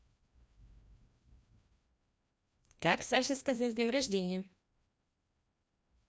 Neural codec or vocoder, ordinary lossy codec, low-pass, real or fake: codec, 16 kHz, 1 kbps, FreqCodec, larger model; none; none; fake